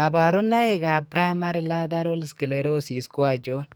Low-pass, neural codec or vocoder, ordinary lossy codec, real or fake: none; codec, 44.1 kHz, 2.6 kbps, SNAC; none; fake